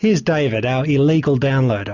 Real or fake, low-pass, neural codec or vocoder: real; 7.2 kHz; none